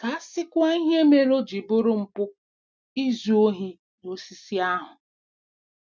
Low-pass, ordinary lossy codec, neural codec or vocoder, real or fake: none; none; none; real